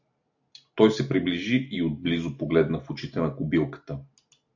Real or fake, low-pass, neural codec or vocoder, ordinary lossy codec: real; 7.2 kHz; none; AAC, 48 kbps